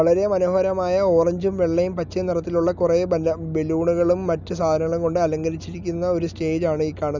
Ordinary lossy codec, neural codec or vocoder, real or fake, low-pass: none; none; real; 7.2 kHz